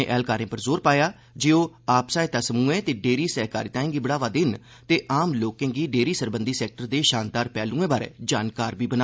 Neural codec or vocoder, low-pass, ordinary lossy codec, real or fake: none; none; none; real